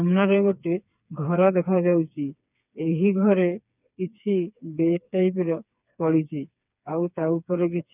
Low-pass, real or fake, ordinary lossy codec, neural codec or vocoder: 3.6 kHz; fake; none; codec, 16 kHz, 4 kbps, FreqCodec, smaller model